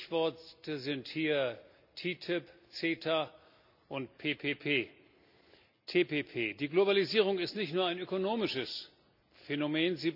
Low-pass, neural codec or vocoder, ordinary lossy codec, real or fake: 5.4 kHz; none; none; real